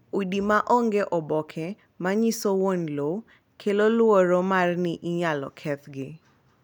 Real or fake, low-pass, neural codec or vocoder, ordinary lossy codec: real; 19.8 kHz; none; none